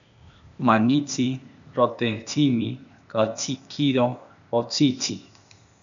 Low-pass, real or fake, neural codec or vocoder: 7.2 kHz; fake; codec, 16 kHz, 0.8 kbps, ZipCodec